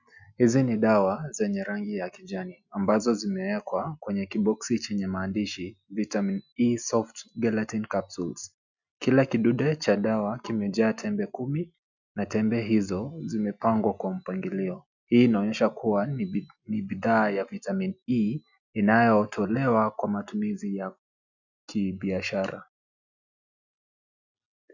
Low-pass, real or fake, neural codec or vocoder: 7.2 kHz; real; none